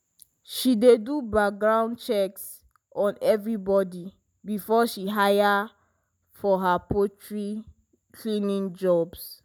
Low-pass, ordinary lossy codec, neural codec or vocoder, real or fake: none; none; none; real